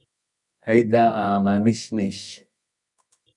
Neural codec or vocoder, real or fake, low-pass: codec, 24 kHz, 0.9 kbps, WavTokenizer, medium music audio release; fake; 10.8 kHz